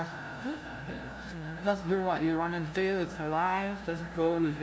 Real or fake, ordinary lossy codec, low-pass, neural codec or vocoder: fake; none; none; codec, 16 kHz, 0.5 kbps, FunCodec, trained on LibriTTS, 25 frames a second